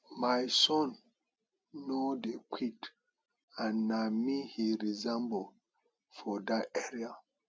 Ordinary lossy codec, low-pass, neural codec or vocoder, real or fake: none; none; none; real